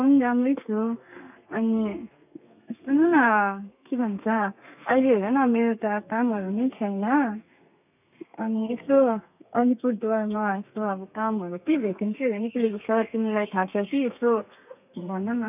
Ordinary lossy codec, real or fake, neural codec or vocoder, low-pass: none; fake; codec, 32 kHz, 1.9 kbps, SNAC; 3.6 kHz